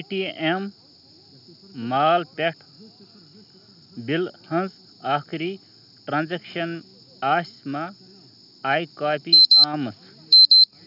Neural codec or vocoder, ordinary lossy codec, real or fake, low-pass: none; none; real; 5.4 kHz